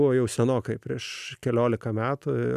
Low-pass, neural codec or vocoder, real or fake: 14.4 kHz; none; real